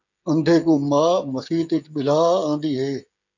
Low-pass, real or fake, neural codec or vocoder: 7.2 kHz; fake; codec, 16 kHz, 16 kbps, FreqCodec, smaller model